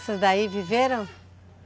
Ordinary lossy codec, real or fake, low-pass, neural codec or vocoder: none; real; none; none